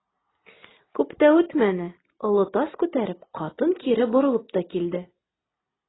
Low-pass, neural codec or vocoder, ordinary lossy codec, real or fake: 7.2 kHz; none; AAC, 16 kbps; real